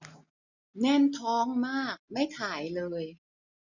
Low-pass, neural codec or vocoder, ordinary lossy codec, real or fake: 7.2 kHz; none; none; real